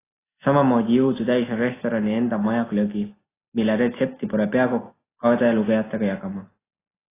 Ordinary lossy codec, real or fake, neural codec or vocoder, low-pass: AAC, 16 kbps; real; none; 3.6 kHz